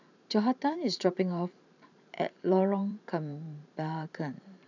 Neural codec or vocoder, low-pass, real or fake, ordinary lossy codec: vocoder, 44.1 kHz, 128 mel bands every 512 samples, BigVGAN v2; 7.2 kHz; fake; none